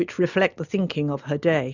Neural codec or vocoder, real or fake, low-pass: none; real; 7.2 kHz